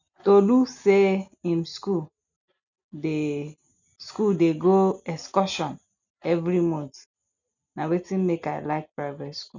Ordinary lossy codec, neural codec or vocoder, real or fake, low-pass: none; none; real; 7.2 kHz